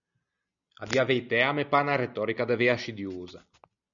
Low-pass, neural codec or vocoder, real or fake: 7.2 kHz; none; real